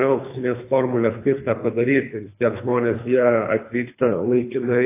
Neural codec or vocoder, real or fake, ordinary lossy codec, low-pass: codec, 24 kHz, 3 kbps, HILCodec; fake; AAC, 24 kbps; 3.6 kHz